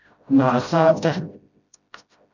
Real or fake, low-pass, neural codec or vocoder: fake; 7.2 kHz; codec, 16 kHz, 0.5 kbps, FreqCodec, smaller model